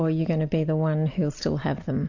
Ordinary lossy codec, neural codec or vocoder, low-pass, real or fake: AAC, 48 kbps; none; 7.2 kHz; real